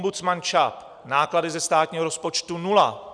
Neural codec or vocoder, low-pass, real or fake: none; 9.9 kHz; real